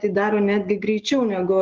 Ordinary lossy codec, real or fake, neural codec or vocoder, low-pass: Opus, 16 kbps; real; none; 7.2 kHz